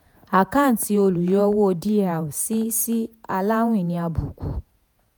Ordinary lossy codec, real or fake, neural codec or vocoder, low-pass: none; fake; vocoder, 48 kHz, 128 mel bands, Vocos; none